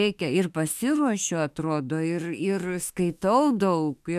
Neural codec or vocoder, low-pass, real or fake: autoencoder, 48 kHz, 32 numbers a frame, DAC-VAE, trained on Japanese speech; 14.4 kHz; fake